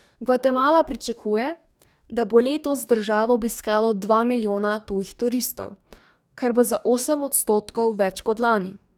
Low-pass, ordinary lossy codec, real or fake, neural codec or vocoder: 19.8 kHz; none; fake; codec, 44.1 kHz, 2.6 kbps, DAC